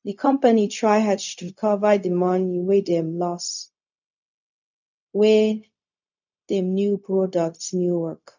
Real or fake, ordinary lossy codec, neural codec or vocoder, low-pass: fake; none; codec, 16 kHz, 0.4 kbps, LongCat-Audio-Codec; 7.2 kHz